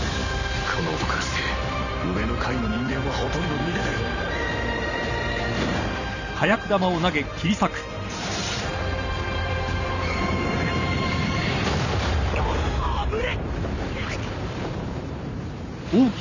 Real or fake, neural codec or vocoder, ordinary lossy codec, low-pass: fake; vocoder, 44.1 kHz, 128 mel bands every 512 samples, BigVGAN v2; none; 7.2 kHz